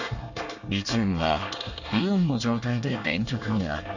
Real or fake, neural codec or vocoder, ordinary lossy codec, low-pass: fake; codec, 24 kHz, 1 kbps, SNAC; none; 7.2 kHz